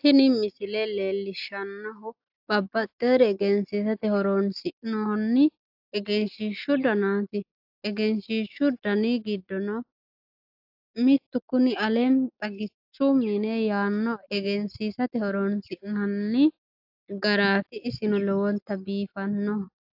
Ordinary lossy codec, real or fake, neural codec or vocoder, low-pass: AAC, 48 kbps; real; none; 5.4 kHz